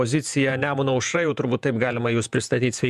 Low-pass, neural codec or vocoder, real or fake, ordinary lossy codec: 14.4 kHz; vocoder, 48 kHz, 128 mel bands, Vocos; fake; MP3, 96 kbps